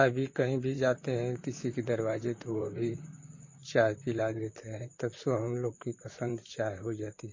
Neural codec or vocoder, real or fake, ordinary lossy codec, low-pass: vocoder, 44.1 kHz, 128 mel bands, Pupu-Vocoder; fake; MP3, 32 kbps; 7.2 kHz